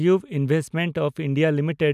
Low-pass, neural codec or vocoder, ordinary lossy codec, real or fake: 14.4 kHz; none; none; real